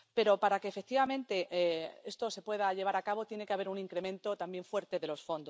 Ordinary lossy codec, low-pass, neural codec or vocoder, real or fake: none; none; none; real